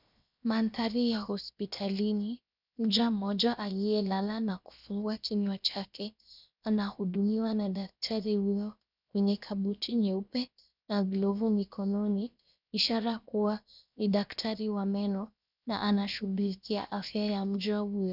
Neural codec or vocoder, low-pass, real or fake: codec, 16 kHz, 0.7 kbps, FocalCodec; 5.4 kHz; fake